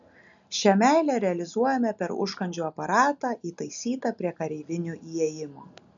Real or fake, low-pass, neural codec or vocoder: real; 7.2 kHz; none